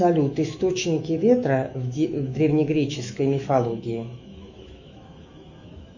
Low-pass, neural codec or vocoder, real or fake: 7.2 kHz; autoencoder, 48 kHz, 128 numbers a frame, DAC-VAE, trained on Japanese speech; fake